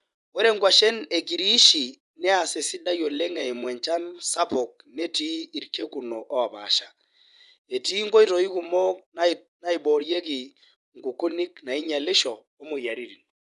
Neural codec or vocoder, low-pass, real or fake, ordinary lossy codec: vocoder, 24 kHz, 100 mel bands, Vocos; 10.8 kHz; fake; none